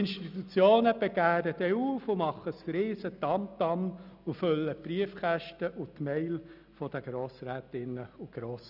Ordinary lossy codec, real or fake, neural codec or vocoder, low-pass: none; real; none; 5.4 kHz